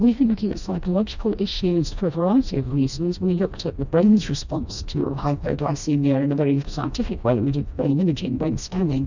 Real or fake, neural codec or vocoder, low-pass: fake; codec, 16 kHz, 1 kbps, FreqCodec, smaller model; 7.2 kHz